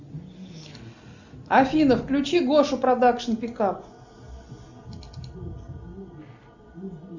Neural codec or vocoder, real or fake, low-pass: none; real; 7.2 kHz